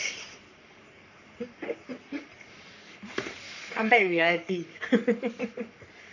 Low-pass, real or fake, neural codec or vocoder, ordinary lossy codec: 7.2 kHz; fake; codec, 44.1 kHz, 3.4 kbps, Pupu-Codec; none